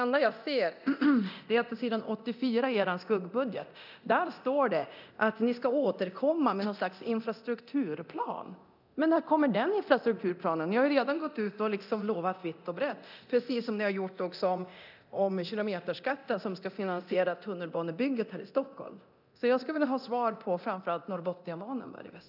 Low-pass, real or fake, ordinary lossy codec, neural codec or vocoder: 5.4 kHz; fake; none; codec, 24 kHz, 0.9 kbps, DualCodec